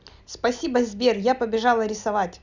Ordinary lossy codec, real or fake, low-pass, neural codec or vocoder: none; real; 7.2 kHz; none